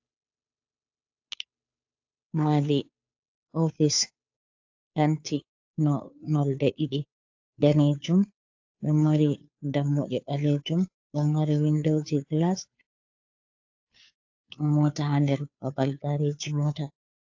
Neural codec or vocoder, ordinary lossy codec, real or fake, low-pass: codec, 16 kHz, 2 kbps, FunCodec, trained on Chinese and English, 25 frames a second; AAC, 48 kbps; fake; 7.2 kHz